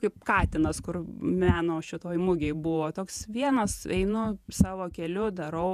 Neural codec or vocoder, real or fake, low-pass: vocoder, 48 kHz, 128 mel bands, Vocos; fake; 14.4 kHz